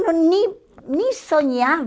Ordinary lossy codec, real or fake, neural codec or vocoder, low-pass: none; real; none; none